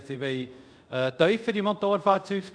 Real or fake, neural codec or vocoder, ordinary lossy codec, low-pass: fake; codec, 24 kHz, 0.5 kbps, DualCodec; none; 9.9 kHz